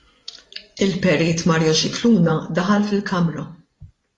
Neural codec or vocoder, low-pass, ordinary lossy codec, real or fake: vocoder, 24 kHz, 100 mel bands, Vocos; 10.8 kHz; AAC, 32 kbps; fake